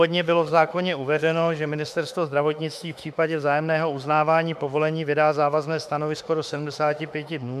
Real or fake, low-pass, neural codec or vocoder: fake; 14.4 kHz; autoencoder, 48 kHz, 32 numbers a frame, DAC-VAE, trained on Japanese speech